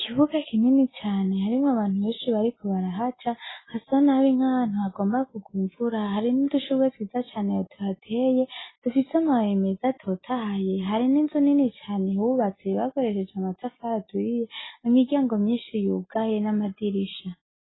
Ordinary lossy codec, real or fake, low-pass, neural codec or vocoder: AAC, 16 kbps; real; 7.2 kHz; none